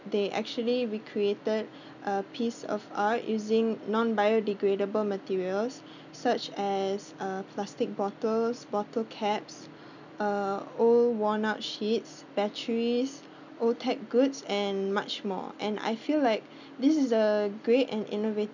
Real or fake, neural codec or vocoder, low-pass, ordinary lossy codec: real; none; 7.2 kHz; none